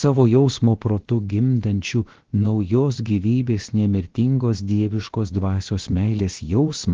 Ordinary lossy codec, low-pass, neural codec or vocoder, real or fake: Opus, 16 kbps; 7.2 kHz; codec, 16 kHz, about 1 kbps, DyCAST, with the encoder's durations; fake